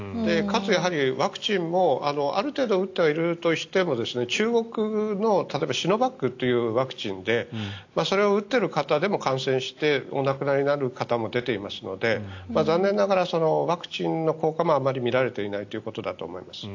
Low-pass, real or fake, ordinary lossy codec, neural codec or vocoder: 7.2 kHz; real; AAC, 48 kbps; none